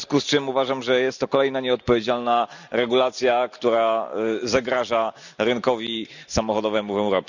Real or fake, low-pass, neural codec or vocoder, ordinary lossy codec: real; 7.2 kHz; none; none